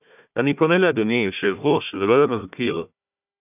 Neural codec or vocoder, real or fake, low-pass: codec, 16 kHz, 1 kbps, FunCodec, trained on Chinese and English, 50 frames a second; fake; 3.6 kHz